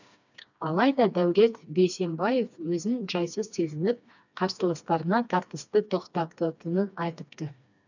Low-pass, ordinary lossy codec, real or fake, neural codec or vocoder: 7.2 kHz; none; fake; codec, 16 kHz, 2 kbps, FreqCodec, smaller model